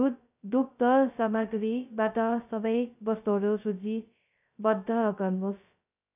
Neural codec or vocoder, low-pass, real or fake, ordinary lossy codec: codec, 16 kHz, 0.2 kbps, FocalCodec; 3.6 kHz; fake; none